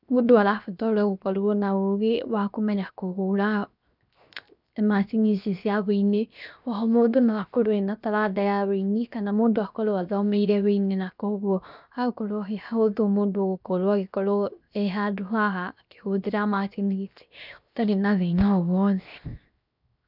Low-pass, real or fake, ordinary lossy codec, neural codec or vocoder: 5.4 kHz; fake; none; codec, 16 kHz, 0.7 kbps, FocalCodec